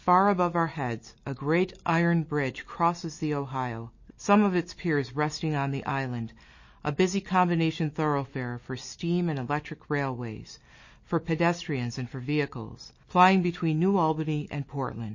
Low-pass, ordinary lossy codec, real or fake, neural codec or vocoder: 7.2 kHz; MP3, 32 kbps; real; none